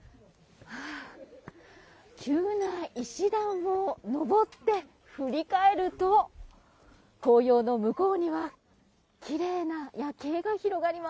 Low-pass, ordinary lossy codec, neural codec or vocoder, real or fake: none; none; none; real